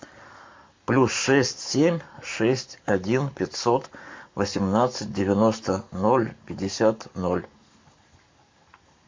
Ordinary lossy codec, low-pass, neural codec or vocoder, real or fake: MP3, 48 kbps; 7.2 kHz; codec, 44.1 kHz, 7.8 kbps, Pupu-Codec; fake